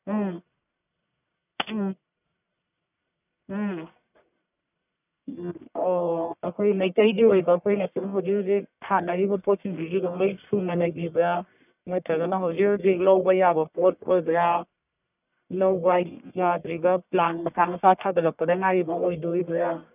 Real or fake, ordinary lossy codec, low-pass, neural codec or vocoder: fake; none; 3.6 kHz; codec, 44.1 kHz, 1.7 kbps, Pupu-Codec